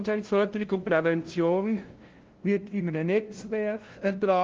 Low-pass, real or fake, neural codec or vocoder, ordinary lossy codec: 7.2 kHz; fake; codec, 16 kHz, 0.5 kbps, FunCodec, trained on LibriTTS, 25 frames a second; Opus, 16 kbps